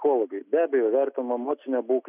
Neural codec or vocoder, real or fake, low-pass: none; real; 3.6 kHz